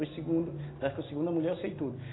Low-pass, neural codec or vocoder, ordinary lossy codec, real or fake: 7.2 kHz; none; AAC, 16 kbps; real